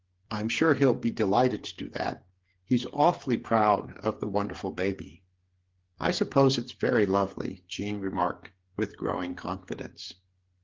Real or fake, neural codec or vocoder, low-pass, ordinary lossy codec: fake; codec, 16 kHz, 8 kbps, FreqCodec, smaller model; 7.2 kHz; Opus, 16 kbps